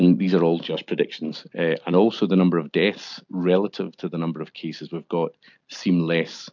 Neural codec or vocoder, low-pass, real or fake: none; 7.2 kHz; real